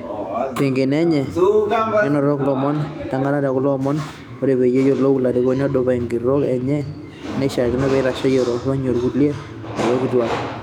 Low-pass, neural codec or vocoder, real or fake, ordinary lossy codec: 19.8 kHz; autoencoder, 48 kHz, 128 numbers a frame, DAC-VAE, trained on Japanese speech; fake; none